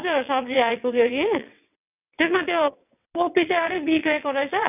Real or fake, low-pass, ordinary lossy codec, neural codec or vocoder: fake; 3.6 kHz; none; vocoder, 22.05 kHz, 80 mel bands, WaveNeXt